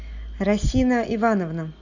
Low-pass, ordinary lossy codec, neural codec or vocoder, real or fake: 7.2 kHz; Opus, 64 kbps; none; real